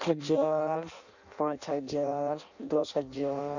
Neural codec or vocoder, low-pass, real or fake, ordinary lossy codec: codec, 16 kHz in and 24 kHz out, 0.6 kbps, FireRedTTS-2 codec; 7.2 kHz; fake; none